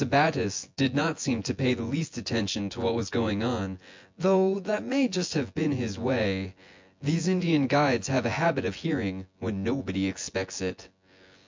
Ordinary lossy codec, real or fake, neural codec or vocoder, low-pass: MP3, 64 kbps; fake; vocoder, 24 kHz, 100 mel bands, Vocos; 7.2 kHz